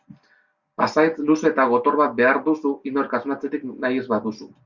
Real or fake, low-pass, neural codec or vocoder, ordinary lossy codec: real; 7.2 kHz; none; Opus, 64 kbps